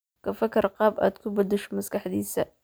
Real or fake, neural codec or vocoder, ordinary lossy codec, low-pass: real; none; none; none